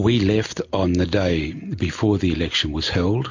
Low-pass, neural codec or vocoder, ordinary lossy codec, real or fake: 7.2 kHz; none; MP3, 48 kbps; real